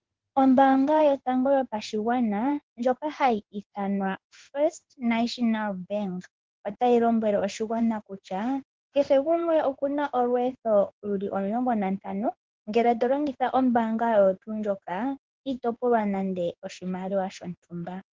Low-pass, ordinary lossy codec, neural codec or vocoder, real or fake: 7.2 kHz; Opus, 16 kbps; codec, 16 kHz in and 24 kHz out, 1 kbps, XY-Tokenizer; fake